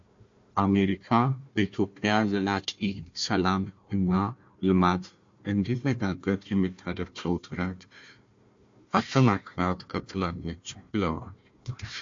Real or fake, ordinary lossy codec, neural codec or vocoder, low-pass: fake; MP3, 48 kbps; codec, 16 kHz, 1 kbps, FunCodec, trained on Chinese and English, 50 frames a second; 7.2 kHz